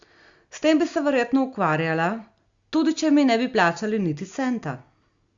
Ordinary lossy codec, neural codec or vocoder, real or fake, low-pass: Opus, 64 kbps; none; real; 7.2 kHz